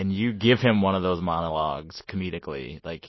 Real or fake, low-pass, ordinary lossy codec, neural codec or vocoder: real; 7.2 kHz; MP3, 24 kbps; none